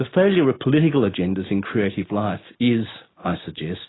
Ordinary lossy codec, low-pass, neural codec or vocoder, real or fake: AAC, 16 kbps; 7.2 kHz; none; real